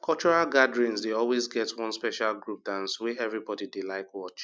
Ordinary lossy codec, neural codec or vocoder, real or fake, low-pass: none; none; real; none